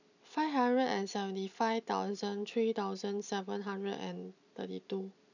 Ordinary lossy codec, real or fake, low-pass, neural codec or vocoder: none; real; 7.2 kHz; none